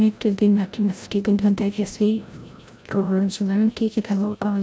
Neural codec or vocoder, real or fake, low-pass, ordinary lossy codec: codec, 16 kHz, 0.5 kbps, FreqCodec, larger model; fake; none; none